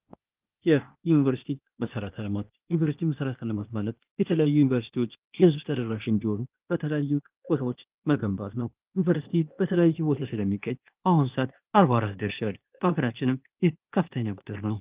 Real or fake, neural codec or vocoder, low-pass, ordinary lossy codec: fake; codec, 16 kHz, 0.8 kbps, ZipCodec; 3.6 kHz; Opus, 32 kbps